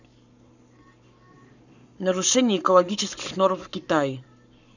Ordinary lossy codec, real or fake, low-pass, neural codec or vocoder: none; fake; 7.2 kHz; codec, 44.1 kHz, 7.8 kbps, Pupu-Codec